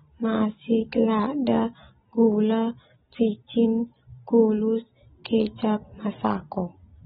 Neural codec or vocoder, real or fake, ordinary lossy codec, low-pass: none; real; AAC, 16 kbps; 10.8 kHz